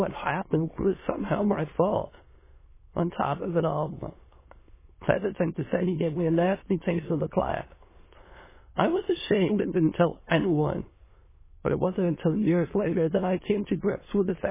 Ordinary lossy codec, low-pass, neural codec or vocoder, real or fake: MP3, 16 kbps; 3.6 kHz; autoencoder, 22.05 kHz, a latent of 192 numbers a frame, VITS, trained on many speakers; fake